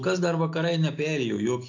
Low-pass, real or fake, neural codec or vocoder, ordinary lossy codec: 7.2 kHz; real; none; AAC, 48 kbps